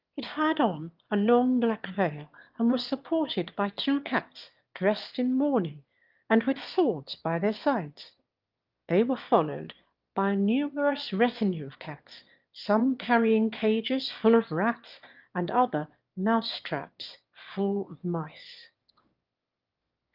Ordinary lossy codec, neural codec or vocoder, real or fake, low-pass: Opus, 32 kbps; autoencoder, 22.05 kHz, a latent of 192 numbers a frame, VITS, trained on one speaker; fake; 5.4 kHz